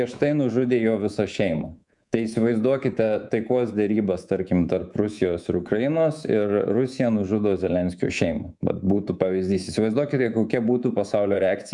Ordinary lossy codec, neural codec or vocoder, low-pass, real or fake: MP3, 96 kbps; codec, 24 kHz, 3.1 kbps, DualCodec; 10.8 kHz; fake